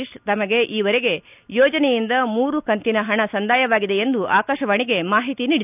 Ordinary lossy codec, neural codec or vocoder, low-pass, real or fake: none; none; 3.6 kHz; real